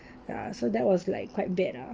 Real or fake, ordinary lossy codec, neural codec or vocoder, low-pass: real; Opus, 24 kbps; none; 7.2 kHz